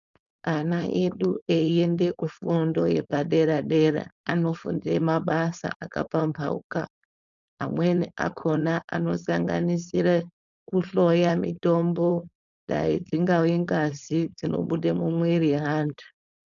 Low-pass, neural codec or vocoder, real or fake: 7.2 kHz; codec, 16 kHz, 4.8 kbps, FACodec; fake